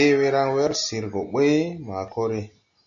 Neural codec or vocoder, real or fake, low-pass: none; real; 7.2 kHz